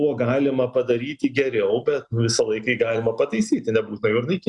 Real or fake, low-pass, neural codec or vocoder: real; 10.8 kHz; none